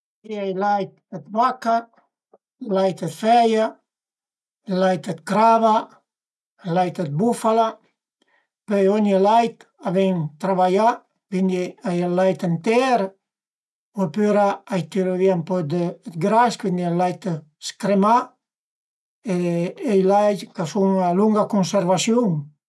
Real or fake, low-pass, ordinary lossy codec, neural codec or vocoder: real; none; none; none